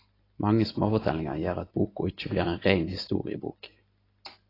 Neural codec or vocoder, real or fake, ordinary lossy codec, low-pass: none; real; AAC, 24 kbps; 5.4 kHz